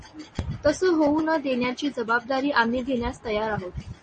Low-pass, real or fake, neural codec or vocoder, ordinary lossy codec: 10.8 kHz; real; none; MP3, 32 kbps